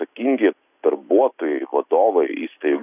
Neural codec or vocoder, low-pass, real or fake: none; 3.6 kHz; real